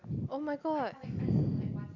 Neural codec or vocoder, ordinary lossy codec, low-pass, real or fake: none; none; 7.2 kHz; real